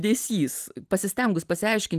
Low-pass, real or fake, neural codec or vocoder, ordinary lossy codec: 14.4 kHz; real; none; Opus, 24 kbps